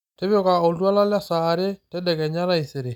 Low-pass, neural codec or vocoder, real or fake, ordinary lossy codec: 19.8 kHz; none; real; none